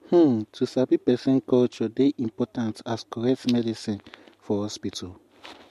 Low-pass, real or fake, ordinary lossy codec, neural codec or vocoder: 14.4 kHz; real; MP3, 64 kbps; none